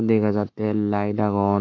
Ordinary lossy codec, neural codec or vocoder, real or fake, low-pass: none; codec, 44.1 kHz, 7.8 kbps, Pupu-Codec; fake; 7.2 kHz